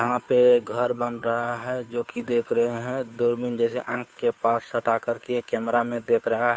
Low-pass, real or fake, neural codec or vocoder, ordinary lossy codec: none; fake; codec, 16 kHz, 2 kbps, FunCodec, trained on Chinese and English, 25 frames a second; none